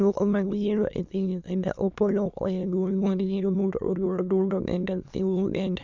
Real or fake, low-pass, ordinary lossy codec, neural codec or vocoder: fake; 7.2 kHz; MP3, 64 kbps; autoencoder, 22.05 kHz, a latent of 192 numbers a frame, VITS, trained on many speakers